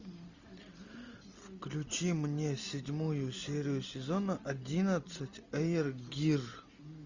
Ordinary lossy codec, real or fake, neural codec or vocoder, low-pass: Opus, 64 kbps; real; none; 7.2 kHz